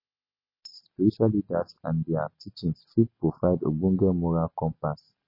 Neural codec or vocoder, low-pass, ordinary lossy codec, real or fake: none; 5.4 kHz; MP3, 24 kbps; real